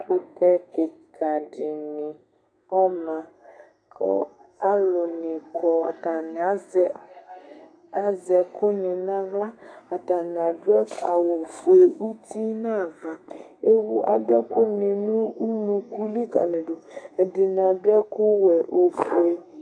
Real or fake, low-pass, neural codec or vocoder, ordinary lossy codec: fake; 9.9 kHz; codec, 32 kHz, 1.9 kbps, SNAC; AAC, 64 kbps